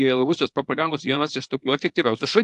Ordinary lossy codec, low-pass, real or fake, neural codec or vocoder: AAC, 64 kbps; 10.8 kHz; fake; codec, 24 kHz, 0.9 kbps, WavTokenizer, small release